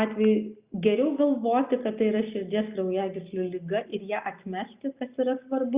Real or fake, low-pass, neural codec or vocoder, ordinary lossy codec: real; 3.6 kHz; none; Opus, 64 kbps